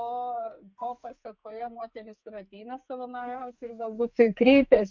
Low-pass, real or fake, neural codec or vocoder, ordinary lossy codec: 7.2 kHz; fake; codec, 44.1 kHz, 2.6 kbps, SNAC; MP3, 48 kbps